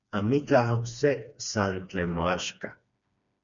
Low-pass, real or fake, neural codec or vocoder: 7.2 kHz; fake; codec, 16 kHz, 2 kbps, FreqCodec, smaller model